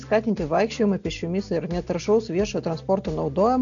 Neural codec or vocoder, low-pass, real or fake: none; 7.2 kHz; real